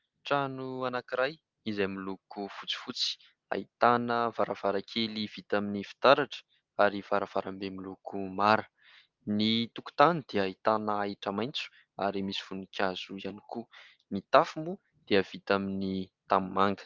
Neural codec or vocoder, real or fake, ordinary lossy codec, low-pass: none; real; Opus, 32 kbps; 7.2 kHz